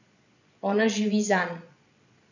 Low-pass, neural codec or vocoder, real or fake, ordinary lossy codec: 7.2 kHz; vocoder, 22.05 kHz, 80 mel bands, Vocos; fake; none